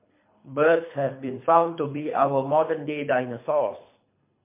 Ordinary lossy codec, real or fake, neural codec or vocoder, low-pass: MP3, 24 kbps; fake; codec, 24 kHz, 3 kbps, HILCodec; 3.6 kHz